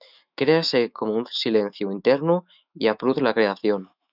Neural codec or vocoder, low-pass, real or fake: autoencoder, 48 kHz, 128 numbers a frame, DAC-VAE, trained on Japanese speech; 5.4 kHz; fake